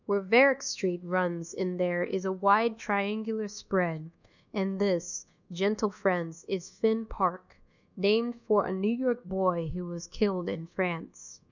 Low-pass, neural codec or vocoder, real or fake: 7.2 kHz; codec, 24 kHz, 1.2 kbps, DualCodec; fake